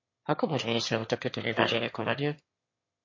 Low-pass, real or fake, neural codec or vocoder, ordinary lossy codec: 7.2 kHz; fake; autoencoder, 22.05 kHz, a latent of 192 numbers a frame, VITS, trained on one speaker; MP3, 32 kbps